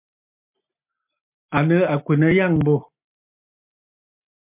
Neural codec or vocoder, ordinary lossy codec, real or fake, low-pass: none; MP3, 32 kbps; real; 3.6 kHz